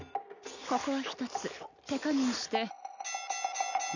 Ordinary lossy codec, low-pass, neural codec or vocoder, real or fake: none; 7.2 kHz; none; real